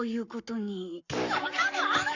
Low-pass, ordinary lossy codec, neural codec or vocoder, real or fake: 7.2 kHz; none; codec, 44.1 kHz, 7.8 kbps, Pupu-Codec; fake